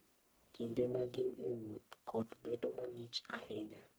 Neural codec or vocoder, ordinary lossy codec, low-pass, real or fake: codec, 44.1 kHz, 1.7 kbps, Pupu-Codec; none; none; fake